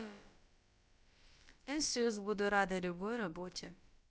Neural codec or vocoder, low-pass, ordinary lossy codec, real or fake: codec, 16 kHz, about 1 kbps, DyCAST, with the encoder's durations; none; none; fake